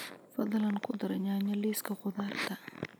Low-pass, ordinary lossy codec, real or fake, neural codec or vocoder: none; none; real; none